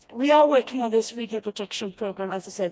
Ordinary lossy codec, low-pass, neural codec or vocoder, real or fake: none; none; codec, 16 kHz, 1 kbps, FreqCodec, smaller model; fake